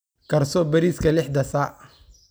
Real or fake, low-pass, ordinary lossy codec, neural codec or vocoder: real; none; none; none